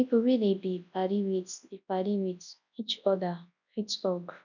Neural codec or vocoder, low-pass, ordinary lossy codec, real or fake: codec, 24 kHz, 0.9 kbps, WavTokenizer, large speech release; 7.2 kHz; none; fake